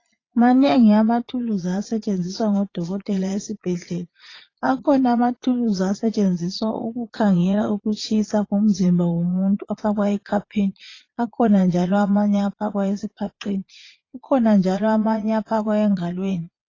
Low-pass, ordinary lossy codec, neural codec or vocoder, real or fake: 7.2 kHz; AAC, 32 kbps; vocoder, 22.05 kHz, 80 mel bands, Vocos; fake